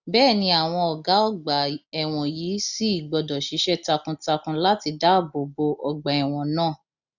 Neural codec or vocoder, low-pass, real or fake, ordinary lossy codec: none; 7.2 kHz; real; none